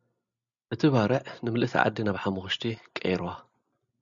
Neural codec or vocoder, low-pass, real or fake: none; 7.2 kHz; real